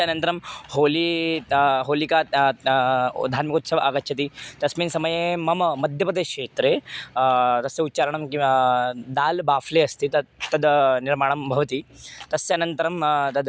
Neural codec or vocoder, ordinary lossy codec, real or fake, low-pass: none; none; real; none